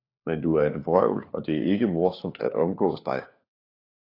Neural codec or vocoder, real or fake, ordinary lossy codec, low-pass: codec, 16 kHz, 4 kbps, FunCodec, trained on LibriTTS, 50 frames a second; fake; AAC, 32 kbps; 5.4 kHz